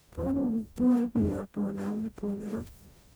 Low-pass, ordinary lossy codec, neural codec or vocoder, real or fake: none; none; codec, 44.1 kHz, 0.9 kbps, DAC; fake